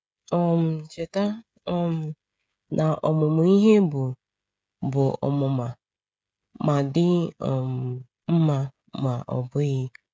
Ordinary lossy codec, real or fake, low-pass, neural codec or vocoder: none; fake; none; codec, 16 kHz, 16 kbps, FreqCodec, smaller model